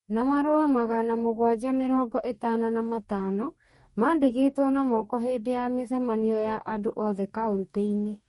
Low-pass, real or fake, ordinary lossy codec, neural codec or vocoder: 19.8 kHz; fake; MP3, 48 kbps; codec, 44.1 kHz, 2.6 kbps, DAC